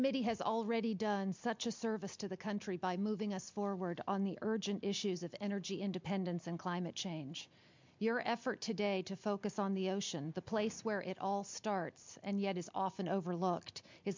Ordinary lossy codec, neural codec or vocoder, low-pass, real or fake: MP3, 64 kbps; none; 7.2 kHz; real